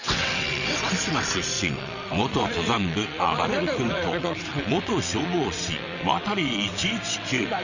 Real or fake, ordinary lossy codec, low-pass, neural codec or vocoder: fake; none; 7.2 kHz; vocoder, 22.05 kHz, 80 mel bands, WaveNeXt